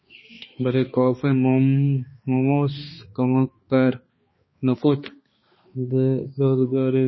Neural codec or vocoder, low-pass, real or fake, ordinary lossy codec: codec, 16 kHz, 2 kbps, X-Codec, HuBERT features, trained on balanced general audio; 7.2 kHz; fake; MP3, 24 kbps